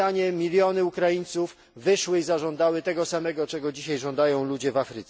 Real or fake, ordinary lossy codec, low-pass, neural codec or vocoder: real; none; none; none